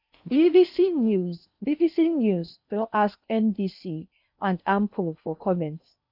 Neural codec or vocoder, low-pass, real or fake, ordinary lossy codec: codec, 16 kHz in and 24 kHz out, 0.8 kbps, FocalCodec, streaming, 65536 codes; 5.4 kHz; fake; none